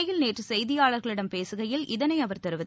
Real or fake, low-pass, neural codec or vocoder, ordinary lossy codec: real; none; none; none